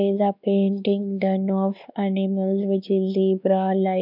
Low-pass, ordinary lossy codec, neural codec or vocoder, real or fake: 5.4 kHz; none; codec, 16 kHz, 2 kbps, X-Codec, WavLM features, trained on Multilingual LibriSpeech; fake